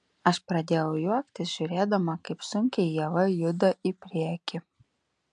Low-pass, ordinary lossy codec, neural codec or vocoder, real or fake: 9.9 kHz; MP3, 64 kbps; none; real